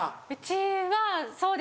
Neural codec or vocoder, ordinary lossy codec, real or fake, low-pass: none; none; real; none